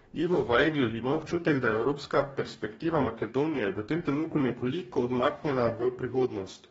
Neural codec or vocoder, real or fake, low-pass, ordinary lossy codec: codec, 44.1 kHz, 2.6 kbps, DAC; fake; 19.8 kHz; AAC, 24 kbps